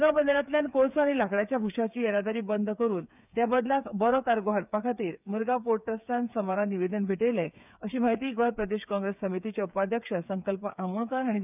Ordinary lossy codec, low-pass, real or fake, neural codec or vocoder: none; 3.6 kHz; fake; codec, 16 kHz, 8 kbps, FreqCodec, smaller model